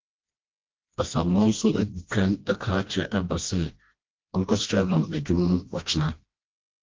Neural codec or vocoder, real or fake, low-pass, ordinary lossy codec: codec, 16 kHz, 1 kbps, FreqCodec, smaller model; fake; 7.2 kHz; Opus, 32 kbps